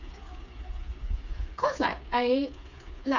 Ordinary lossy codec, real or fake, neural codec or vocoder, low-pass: none; fake; codec, 16 kHz, 4 kbps, FreqCodec, smaller model; 7.2 kHz